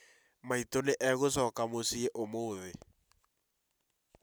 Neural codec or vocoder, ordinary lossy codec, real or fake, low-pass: none; none; real; none